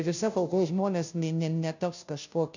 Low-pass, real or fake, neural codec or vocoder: 7.2 kHz; fake; codec, 16 kHz, 0.5 kbps, FunCodec, trained on Chinese and English, 25 frames a second